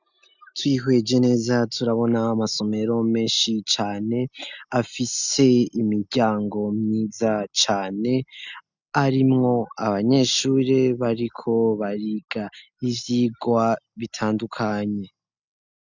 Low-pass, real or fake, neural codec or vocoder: 7.2 kHz; real; none